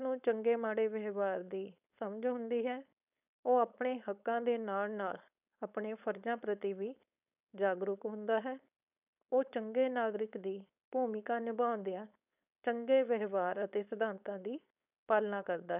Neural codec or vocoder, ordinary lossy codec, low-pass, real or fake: codec, 16 kHz, 4.8 kbps, FACodec; none; 3.6 kHz; fake